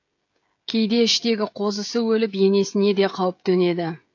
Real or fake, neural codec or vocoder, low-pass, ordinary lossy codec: fake; codec, 16 kHz, 16 kbps, FreqCodec, smaller model; 7.2 kHz; AAC, 48 kbps